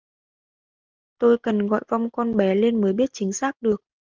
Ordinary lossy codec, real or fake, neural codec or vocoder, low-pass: Opus, 32 kbps; real; none; 7.2 kHz